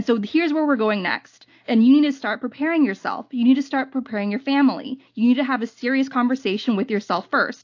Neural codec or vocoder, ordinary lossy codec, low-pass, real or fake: none; AAC, 48 kbps; 7.2 kHz; real